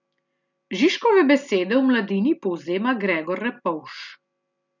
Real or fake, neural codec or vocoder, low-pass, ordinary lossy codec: real; none; 7.2 kHz; none